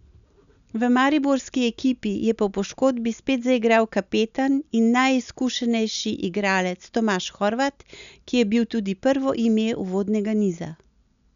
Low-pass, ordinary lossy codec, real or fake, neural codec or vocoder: 7.2 kHz; none; real; none